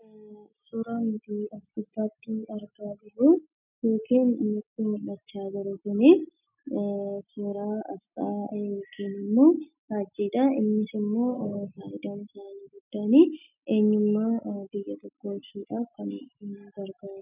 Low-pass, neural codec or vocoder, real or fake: 3.6 kHz; none; real